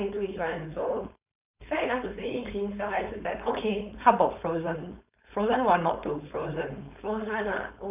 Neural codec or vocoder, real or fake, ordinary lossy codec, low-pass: codec, 16 kHz, 4.8 kbps, FACodec; fake; none; 3.6 kHz